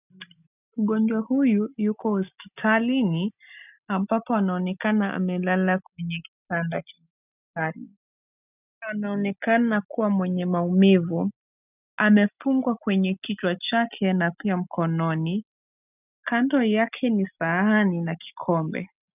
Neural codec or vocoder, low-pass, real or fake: none; 3.6 kHz; real